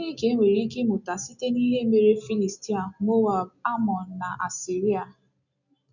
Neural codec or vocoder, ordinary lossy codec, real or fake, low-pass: none; none; real; 7.2 kHz